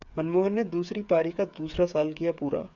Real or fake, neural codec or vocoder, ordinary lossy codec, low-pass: fake; codec, 16 kHz, 8 kbps, FreqCodec, smaller model; MP3, 96 kbps; 7.2 kHz